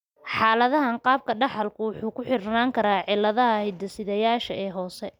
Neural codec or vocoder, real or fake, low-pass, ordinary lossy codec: none; real; 19.8 kHz; none